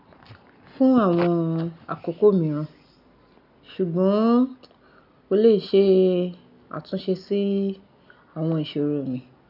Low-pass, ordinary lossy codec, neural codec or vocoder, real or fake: 5.4 kHz; none; none; real